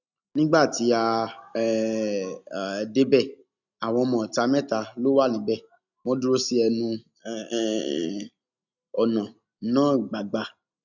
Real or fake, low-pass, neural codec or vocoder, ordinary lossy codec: real; 7.2 kHz; none; none